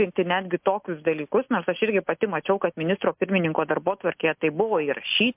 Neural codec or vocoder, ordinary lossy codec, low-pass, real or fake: none; MP3, 32 kbps; 3.6 kHz; real